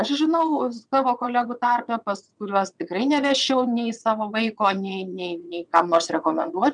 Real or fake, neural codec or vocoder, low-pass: fake; vocoder, 22.05 kHz, 80 mel bands, WaveNeXt; 9.9 kHz